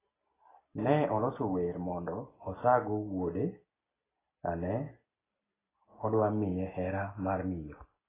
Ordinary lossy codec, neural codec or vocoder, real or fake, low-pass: AAC, 16 kbps; none; real; 3.6 kHz